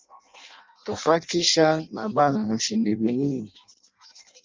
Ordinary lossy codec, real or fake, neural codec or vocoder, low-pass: Opus, 32 kbps; fake; codec, 16 kHz in and 24 kHz out, 0.6 kbps, FireRedTTS-2 codec; 7.2 kHz